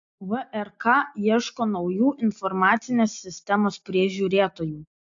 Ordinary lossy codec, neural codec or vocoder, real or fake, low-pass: AAC, 64 kbps; none; real; 7.2 kHz